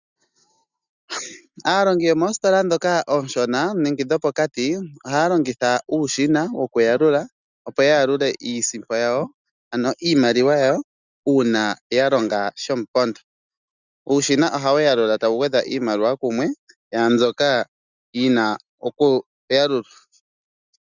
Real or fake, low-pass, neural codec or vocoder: real; 7.2 kHz; none